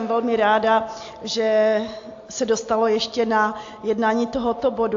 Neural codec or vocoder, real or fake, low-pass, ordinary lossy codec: none; real; 7.2 kHz; AAC, 48 kbps